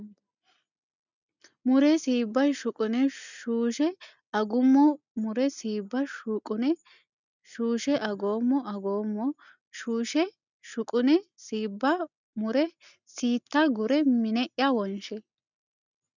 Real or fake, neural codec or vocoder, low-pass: real; none; 7.2 kHz